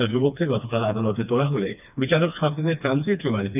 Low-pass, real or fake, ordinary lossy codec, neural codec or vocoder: 3.6 kHz; fake; none; codec, 16 kHz, 2 kbps, FreqCodec, smaller model